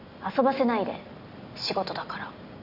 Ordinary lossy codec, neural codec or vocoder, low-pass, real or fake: none; none; 5.4 kHz; real